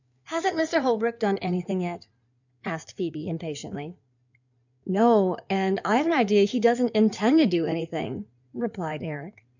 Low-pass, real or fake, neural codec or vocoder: 7.2 kHz; fake; codec, 16 kHz in and 24 kHz out, 2.2 kbps, FireRedTTS-2 codec